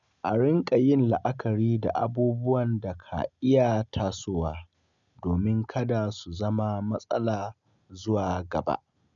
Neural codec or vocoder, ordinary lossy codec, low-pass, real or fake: none; none; 7.2 kHz; real